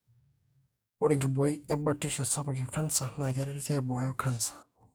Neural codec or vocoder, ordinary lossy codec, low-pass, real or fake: codec, 44.1 kHz, 2.6 kbps, DAC; none; none; fake